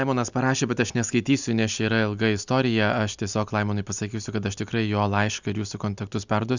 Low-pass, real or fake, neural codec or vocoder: 7.2 kHz; real; none